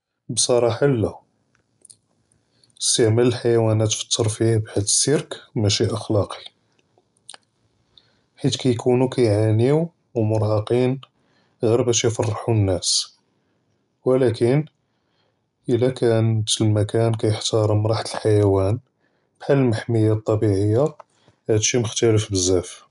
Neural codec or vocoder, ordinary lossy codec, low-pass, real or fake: none; none; 10.8 kHz; real